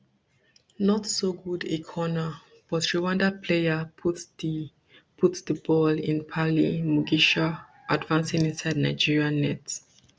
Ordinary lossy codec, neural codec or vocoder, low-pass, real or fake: none; none; none; real